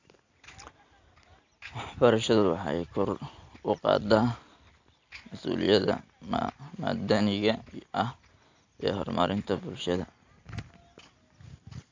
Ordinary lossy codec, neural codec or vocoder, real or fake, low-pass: AAC, 48 kbps; none; real; 7.2 kHz